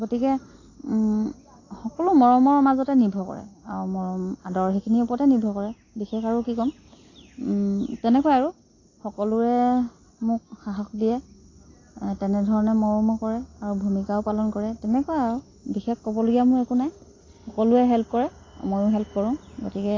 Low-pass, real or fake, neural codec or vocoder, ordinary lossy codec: 7.2 kHz; real; none; AAC, 32 kbps